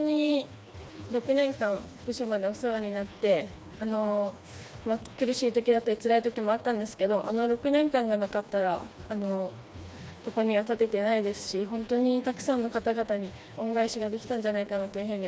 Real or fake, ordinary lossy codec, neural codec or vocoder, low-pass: fake; none; codec, 16 kHz, 2 kbps, FreqCodec, smaller model; none